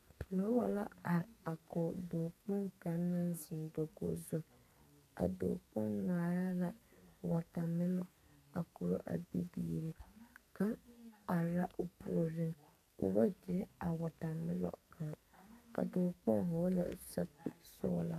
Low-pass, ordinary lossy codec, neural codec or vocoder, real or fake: 14.4 kHz; AAC, 96 kbps; codec, 44.1 kHz, 2.6 kbps, SNAC; fake